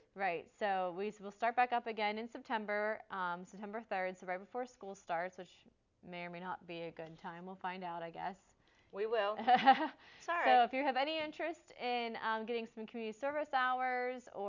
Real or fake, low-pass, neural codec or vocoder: real; 7.2 kHz; none